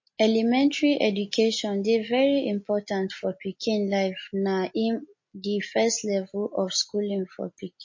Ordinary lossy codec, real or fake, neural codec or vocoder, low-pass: MP3, 32 kbps; real; none; 7.2 kHz